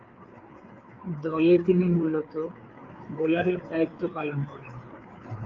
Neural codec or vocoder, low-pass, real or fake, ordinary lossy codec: codec, 16 kHz, 4 kbps, FreqCodec, larger model; 7.2 kHz; fake; Opus, 24 kbps